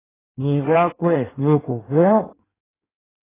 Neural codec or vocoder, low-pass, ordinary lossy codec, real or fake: codec, 16 kHz in and 24 kHz out, 1.1 kbps, FireRedTTS-2 codec; 3.6 kHz; AAC, 16 kbps; fake